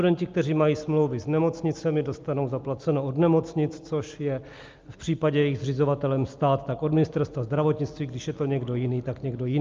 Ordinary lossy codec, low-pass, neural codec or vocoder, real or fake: Opus, 24 kbps; 7.2 kHz; none; real